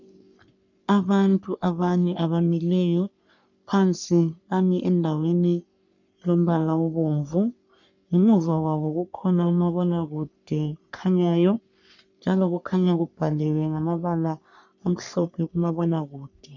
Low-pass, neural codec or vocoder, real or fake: 7.2 kHz; codec, 44.1 kHz, 3.4 kbps, Pupu-Codec; fake